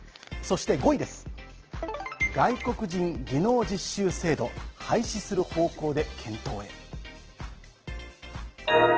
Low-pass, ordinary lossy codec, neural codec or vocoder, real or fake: 7.2 kHz; Opus, 16 kbps; none; real